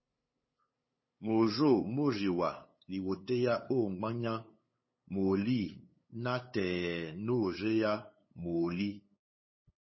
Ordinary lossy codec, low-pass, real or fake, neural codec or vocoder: MP3, 24 kbps; 7.2 kHz; fake; codec, 16 kHz, 8 kbps, FunCodec, trained on LibriTTS, 25 frames a second